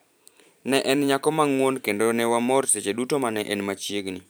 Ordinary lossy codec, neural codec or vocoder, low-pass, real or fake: none; vocoder, 44.1 kHz, 128 mel bands every 512 samples, BigVGAN v2; none; fake